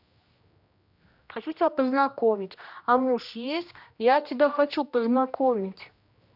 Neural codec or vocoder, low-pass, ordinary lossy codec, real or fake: codec, 16 kHz, 1 kbps, X-Codec, HuBERT features, trained on general audio; 5.4 kHz; none; fake